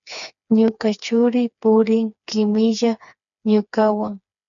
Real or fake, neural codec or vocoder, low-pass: fake; codec, 16 kHz, 4 kbps, FreqCodec, smaller model; 7.2 kHz